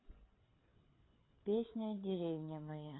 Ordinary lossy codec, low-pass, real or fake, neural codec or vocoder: AAC, 16 kbps; 7.2 kHz; fake; codec, 16 kHz, 4 kbps, FreqCodec, larger model